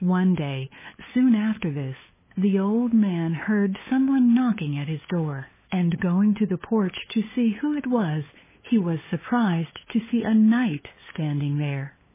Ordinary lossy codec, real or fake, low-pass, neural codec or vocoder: MP3, 16 kbps; fake; 3.6 kHz; codec, 16 kHz, 8 kbps, FunCodec, trained on LibriTTS, 25 frames a second